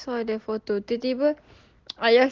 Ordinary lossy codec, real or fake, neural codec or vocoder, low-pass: Opus, 16 kbps; real; none; 7.2 kHz